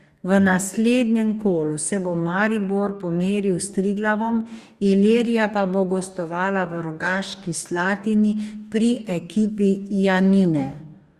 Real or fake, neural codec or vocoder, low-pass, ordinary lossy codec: fake; codec, 44.1 kHz, 2.6 kbps, DAC; 14.4 kHz; Opus, 64 kbps